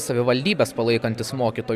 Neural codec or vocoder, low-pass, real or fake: none; 14.4 kHz; real